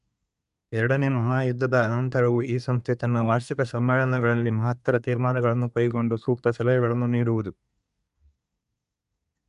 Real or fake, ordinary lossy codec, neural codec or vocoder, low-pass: fake; MP3, 96 kbps; codec, 24 kHz, 1 kbps, SNAC; 10.8 kHz